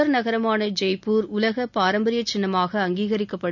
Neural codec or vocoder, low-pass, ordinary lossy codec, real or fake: none; 7.2 kHz; none; real